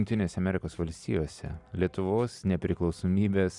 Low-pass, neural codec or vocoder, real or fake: 10.8 kHz; none; real